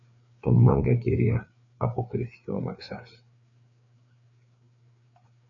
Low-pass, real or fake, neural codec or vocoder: 7.2 kHz; fake; codec, 16 kHz, 4 kbps, FreqCodec, larger model